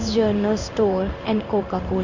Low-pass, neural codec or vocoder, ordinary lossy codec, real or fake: 7.2 kHz; codec, 16 kHz in and 24 kHz out, 1 kbps, XY-Tokenizer; Opus, 64 kbps; fake